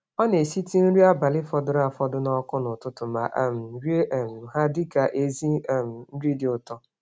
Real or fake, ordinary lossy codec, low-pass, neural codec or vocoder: real; none; none; none